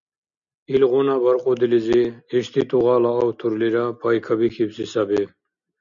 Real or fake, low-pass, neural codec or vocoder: real; 7.2 kHz; none